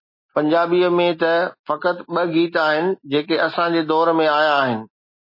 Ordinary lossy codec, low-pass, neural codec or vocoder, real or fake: MP3, 24 kbps; 5.4 kHz; none; real